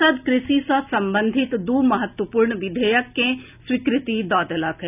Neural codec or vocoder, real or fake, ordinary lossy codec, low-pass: none; real; none; 3.6 kHz